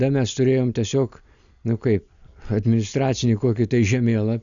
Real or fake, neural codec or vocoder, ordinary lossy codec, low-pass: real; none; MP3, 96 kbps; 7.2 kHz